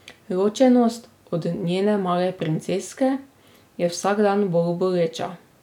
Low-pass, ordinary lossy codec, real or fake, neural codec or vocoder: 19.8 kHz; none; real; none